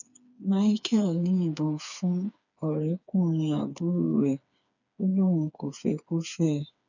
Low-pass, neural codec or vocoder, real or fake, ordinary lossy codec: 7.2 kHz; codec, 16 kHz, 4 kbps, FreqCodec, smaller model; fake; none